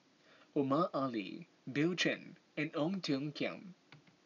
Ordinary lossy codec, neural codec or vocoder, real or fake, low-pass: none; none; real; 7.2 kHz